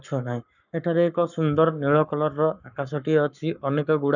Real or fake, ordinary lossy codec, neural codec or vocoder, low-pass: fake; none; codec, 44.1 kHz, 7.8 kbps, Pupu-Codec; 7.2 kHz